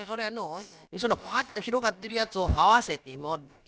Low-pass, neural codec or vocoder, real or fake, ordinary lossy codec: none; codec, 16 kHz, about 1 kbps, DyCAST, with the encoder's durations; fake; none